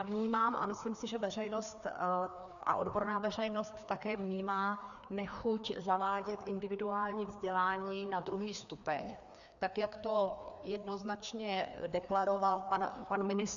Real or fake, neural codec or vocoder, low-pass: fake; codec, 16 kHz, 2 kbps, FreqCodec, larger model; 7.2 kHz